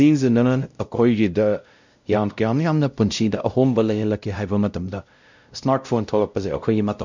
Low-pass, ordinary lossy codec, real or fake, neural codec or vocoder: 7.2 kHz; none; fake; codec, 16 kHz, 0.5 kbps, X-Codec, WavLM features, trained on Multilingual LibriSpeech